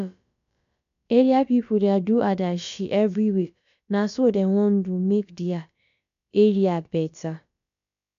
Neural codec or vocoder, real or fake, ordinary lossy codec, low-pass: codec, 16 kHz, about 1 kbps, DyCAST, with the encoder's durations; fake; MP3, 64 kbps; 7.2 kHz